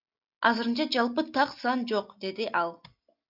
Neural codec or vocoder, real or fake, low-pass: none; real; 5.4 kHz